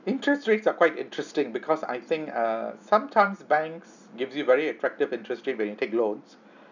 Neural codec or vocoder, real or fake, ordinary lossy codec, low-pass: none; real; none; 7.2 kHz